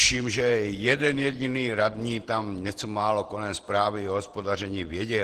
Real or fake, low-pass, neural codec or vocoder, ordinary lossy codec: fake; 14.4 kHz; vocoder, 48 kHz, 128 mel bands, Vocos; Opus, 16 kbps